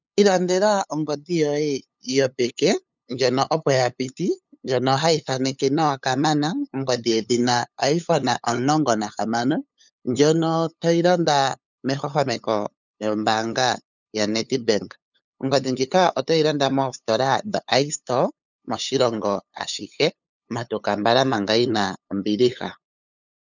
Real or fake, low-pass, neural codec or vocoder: fake; 7.2 kHz; codec, 16 kHz, 8 kbps, FunCodec, trained on LibriTTS, 25 frames a second